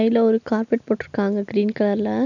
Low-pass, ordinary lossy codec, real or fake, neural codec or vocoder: 7.2 kHz; none; real; none